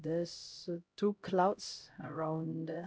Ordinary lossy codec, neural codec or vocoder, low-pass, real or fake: none; codec, 16 kHz, 0.5 kbps, X-Codec, HuBERT features, trained on LibriSpeech; none; fake